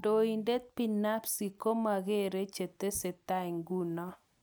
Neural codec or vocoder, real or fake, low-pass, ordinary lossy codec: none; real; none; none